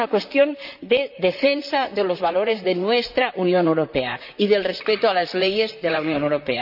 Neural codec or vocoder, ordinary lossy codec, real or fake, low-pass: vocoder, 44.1 kHz, 128 mel bands, Pupu-Vocoder; none; fake; 5.4 kHz